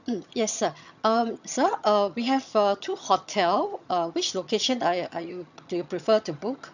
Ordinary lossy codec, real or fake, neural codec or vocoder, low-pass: none; fake; vocoder, 22.05 kHz, 80 mel bands, HiFi-GAN; 7.2 kHz